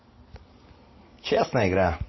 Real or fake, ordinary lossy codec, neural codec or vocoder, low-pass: real; MP3, 24 kbps; none; 7.2 kHz